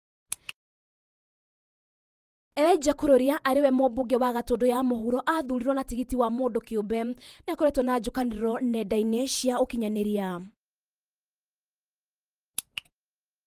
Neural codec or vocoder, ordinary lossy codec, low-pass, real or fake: vocoder, 48 kHz, 128 mel bands, Vocos; Opus, 32 kbps; 14.4 kHz; fake